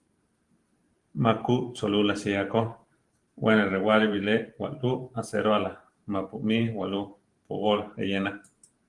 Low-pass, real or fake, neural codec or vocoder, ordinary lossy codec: 10.8 kHz; fake; vocoder, 44.1 kHz, 128 mel bands every 512 samples, BigVGAN v2; Opus, 24 kbps